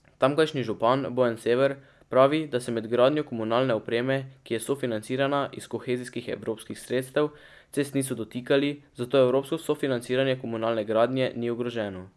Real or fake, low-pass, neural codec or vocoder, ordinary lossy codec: real; none; none; none